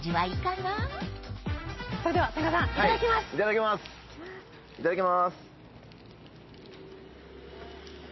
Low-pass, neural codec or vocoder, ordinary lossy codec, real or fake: 7.2 kHz; none; MP3, 24 kbps; real